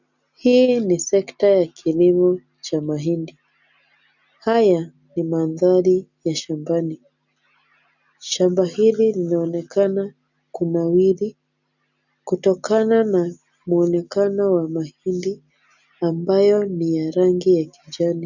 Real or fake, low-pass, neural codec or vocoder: real; 7.2 kHz; none